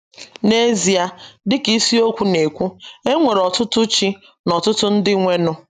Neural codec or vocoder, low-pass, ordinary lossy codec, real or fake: none; 14.4 kHz; none; real